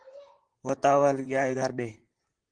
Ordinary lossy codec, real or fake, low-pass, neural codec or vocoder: Opus, 16 kbps; fake; 9.9 kHz; vocoder, 44.1 kHz, 128 mel bands, Pupu-Vocoder